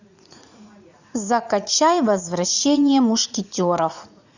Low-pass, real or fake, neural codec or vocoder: 7.2 kHz; fake; vocoder, 44.1 kHz, 80 mel bands, Vocos